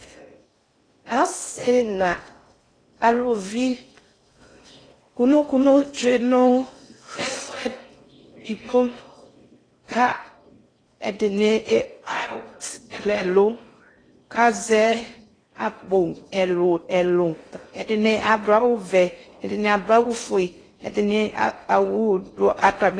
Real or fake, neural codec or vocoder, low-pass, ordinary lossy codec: fake; codec, 16 kHz in and 24 kHz out, 0.6 kbps, FocalCodec, streaming, 4096 codes; 9.9 kHz; AAC, 32 kbps